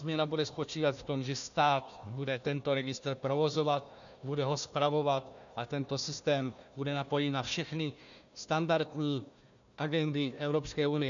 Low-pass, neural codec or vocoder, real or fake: 7.2 kHz; codec, 16 kHz, 1 kbps, FunCodec, trained on Chinese and English, 50 frames a second; fake